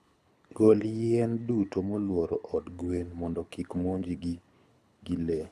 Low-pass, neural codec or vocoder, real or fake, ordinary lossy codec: none; codec, 24 kHz, 6 kbps, HILCodec; fake; none